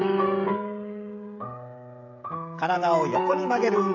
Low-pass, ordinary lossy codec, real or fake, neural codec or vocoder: 7.2 kHz; AAC, 48 kbps; fake; codec, 16 kHz, 16 kbps, FreqCodec, smaller model